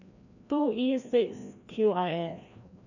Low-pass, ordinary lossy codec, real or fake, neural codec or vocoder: 7.2 kHz; none; fake; codec, 16 kHz, 1 kbps, FreqCodec, larger model